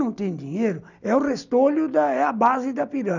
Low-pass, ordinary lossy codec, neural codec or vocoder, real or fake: 7.2 kHz; none; none; real